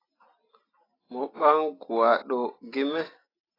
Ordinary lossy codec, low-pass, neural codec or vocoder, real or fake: AAC, 24 kbps; 5.4 kHz; none; real